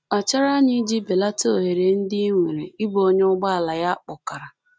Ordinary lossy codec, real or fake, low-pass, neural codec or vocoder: none; real; none; none